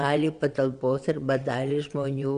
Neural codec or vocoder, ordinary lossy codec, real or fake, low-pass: vocoder, 22.05 kHz, 80 mel bands, WaveNeXt; Opus, 64 kbps; fake; 9.9 kHz